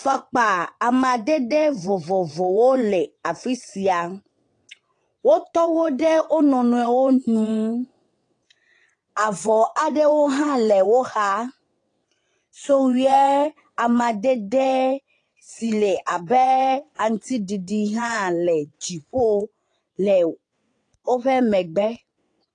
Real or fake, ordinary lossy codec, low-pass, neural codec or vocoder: fake; AAC, 48 kbps; 9.9 kHz; vocoder, 22.05 kHz, 80 mel bands, WaveNeXt